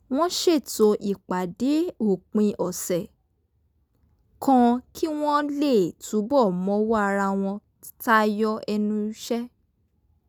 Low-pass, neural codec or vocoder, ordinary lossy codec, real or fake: none; none; none; real